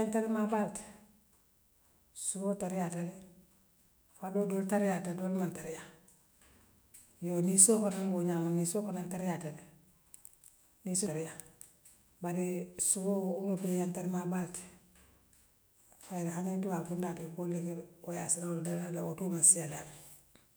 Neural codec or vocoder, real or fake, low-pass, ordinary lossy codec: autoencoder, 48 kHz, 128 numbers a frame, DAC-VAE, trained on Japanese speech; fake; none; none